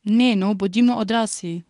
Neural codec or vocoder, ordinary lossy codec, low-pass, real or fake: codec, 24 kHz, 0.9 kbps, WavTokenizer, medium speech release version 1; none; 10.8 kHz; fake